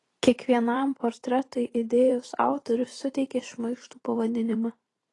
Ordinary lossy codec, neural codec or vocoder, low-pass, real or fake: AAC, 32 kbps; vocoder, 44.1 kHz, 128 mel bands every 512 samples, BigVGAN v2; 10.8 kHz; fake